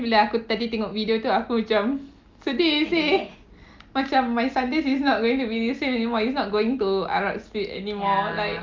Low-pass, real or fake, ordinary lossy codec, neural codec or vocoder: 7.2 kHz; real; Opus, 32 kbps; none